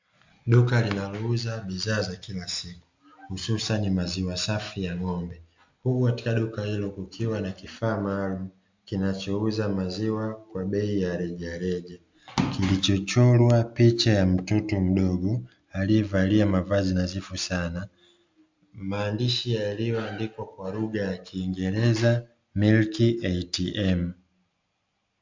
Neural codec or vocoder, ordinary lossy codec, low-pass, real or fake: none; MP3, 64 kbps; 7.2 kHz; real